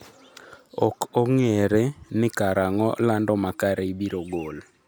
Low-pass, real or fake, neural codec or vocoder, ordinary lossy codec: none; real; none; none